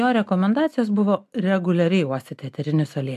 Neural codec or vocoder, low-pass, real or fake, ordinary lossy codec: none; 14.4 kHz; real; MP3, 96 kbps